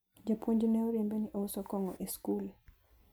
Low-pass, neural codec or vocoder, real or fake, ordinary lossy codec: none; none; real; none